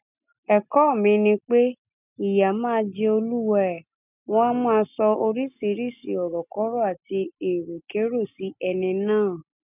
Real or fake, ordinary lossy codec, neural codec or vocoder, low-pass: real; none; none; 3.6 kHz